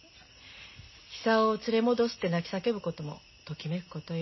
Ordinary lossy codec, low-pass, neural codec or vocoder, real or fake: MP3, 24 kbps; 7.2 kHz; none; real